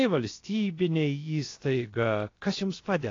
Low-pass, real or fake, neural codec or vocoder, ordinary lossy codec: 7.2 kHz; fake; codec, 16 kHz, about 1 kbps, DyCAST, with the encoder's durations; AAC, 32 kbps